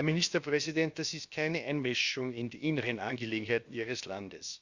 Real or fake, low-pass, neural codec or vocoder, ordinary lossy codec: fake; 7.2 kHz; codec, 16 kHz, about 1 kbps, DyCAST, with the encoder's durations; Opus, 64 kbps